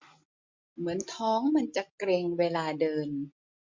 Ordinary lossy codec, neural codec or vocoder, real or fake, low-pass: MP3, 64 kbps; none; real; 7.2 kHz